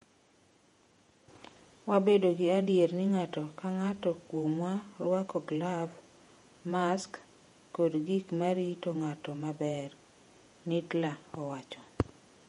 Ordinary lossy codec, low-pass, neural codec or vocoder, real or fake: MP3, 48 kbps; 19.8 kHz; vocoder, 48 kHz, 128 mel bands, Vocos; fake